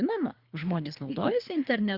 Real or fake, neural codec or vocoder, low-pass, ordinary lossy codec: fake; codec, 24 kHz, 3 kbps, HILCodec; 5.4 kHz; Opus, 64 kbps